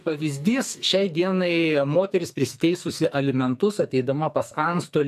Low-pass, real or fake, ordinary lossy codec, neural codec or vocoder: 14.4 kHz; fake; AAC, 96 kbps; codec, 44.1 kHz, 2.6 kbps, SNAC